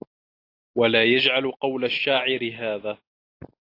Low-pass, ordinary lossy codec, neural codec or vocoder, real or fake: 5.4 kHz; AAC, 32 kbps; none; real